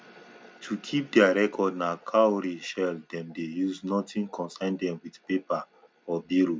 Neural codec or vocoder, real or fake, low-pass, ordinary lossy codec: none; real; none; none